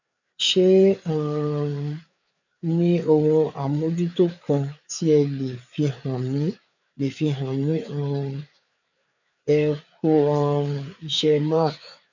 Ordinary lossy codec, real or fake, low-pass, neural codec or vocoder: none; fake; 7.2 kHz; codec, 16 kHz, 4 kbps, FreqCodec, larger model